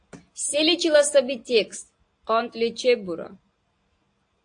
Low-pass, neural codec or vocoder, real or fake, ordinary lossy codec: 9.9 kHz; none; real; AAC, 48 kbps